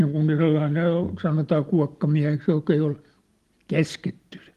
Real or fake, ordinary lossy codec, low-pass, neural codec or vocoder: real; Opus, 24 kbps; 14.4 kHz; none